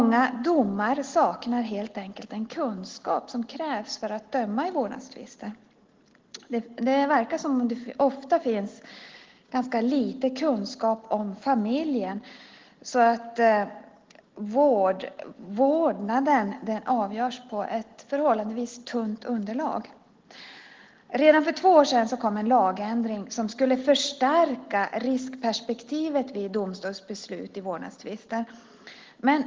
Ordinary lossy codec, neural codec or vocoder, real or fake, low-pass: Opus, 16 kbps; none; real; 7.2 kHz